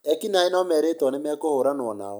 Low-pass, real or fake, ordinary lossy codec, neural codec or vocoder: none; real; none; none